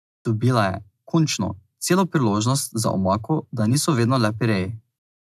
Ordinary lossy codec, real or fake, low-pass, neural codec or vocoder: none; real; 14.4 kHz; none